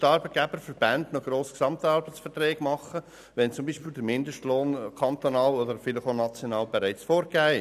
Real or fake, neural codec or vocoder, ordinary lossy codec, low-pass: real; none; none; 14.4 kHz